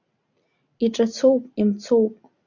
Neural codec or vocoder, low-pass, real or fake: none; 7.2 kHz; real